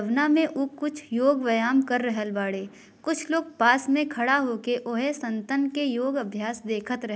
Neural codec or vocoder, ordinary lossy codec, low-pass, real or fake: none; none; none; real